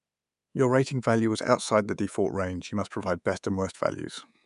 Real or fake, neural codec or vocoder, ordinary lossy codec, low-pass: fake; codec, 24 kHz, 3.1 kbps, DualCodec; none; 10.8 kHz